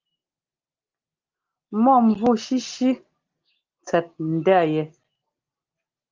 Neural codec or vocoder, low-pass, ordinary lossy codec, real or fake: none; 7.2 kHz; Opus, 32 kbps; real